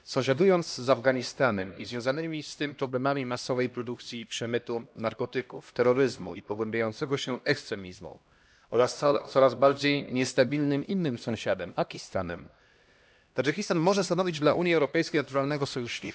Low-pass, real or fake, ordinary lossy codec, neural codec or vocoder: none; fake; none; codec, 16 kHz, 1 kbps, X-Codec, HuBERT features, trained on LibriSpeech